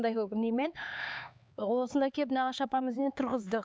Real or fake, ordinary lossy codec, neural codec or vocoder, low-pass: fake; none; codec, 16 kHz, 4 kbps, X-Codec, HuBERT features, trained on LibriSpeech; none